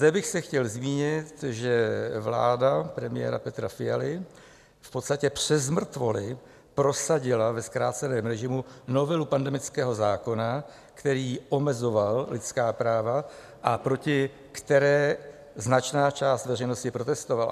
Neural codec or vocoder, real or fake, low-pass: none; real; 14.4 kHz